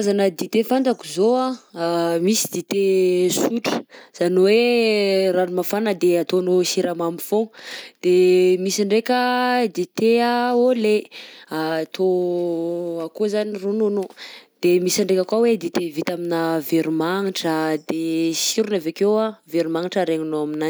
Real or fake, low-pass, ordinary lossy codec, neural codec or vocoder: real; none; none; none